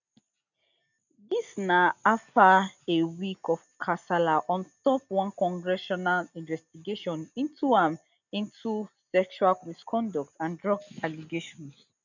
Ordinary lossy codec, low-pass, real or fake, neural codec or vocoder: none; 7.2 kHz; real; none